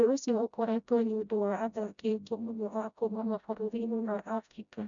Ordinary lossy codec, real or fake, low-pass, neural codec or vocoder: none; fake; 7.2 kHz; codec, 16 kHz, 0.5 kbps, FreqCodec, smaller model